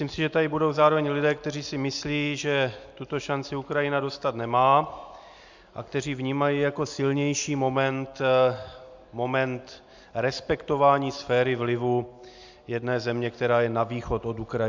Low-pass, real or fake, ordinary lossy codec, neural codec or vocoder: 7.2 kHz; real; MP3, 64 kbps; none